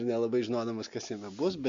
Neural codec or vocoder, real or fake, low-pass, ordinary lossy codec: none; real; 7.2 kHz; MP3, 48 kbps